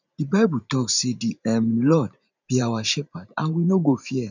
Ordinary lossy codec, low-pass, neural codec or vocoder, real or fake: none; 7.2 kHz; vocoder, 44.1 kHz, 128 mel bands every 512 samples, BigVGAN v2; fake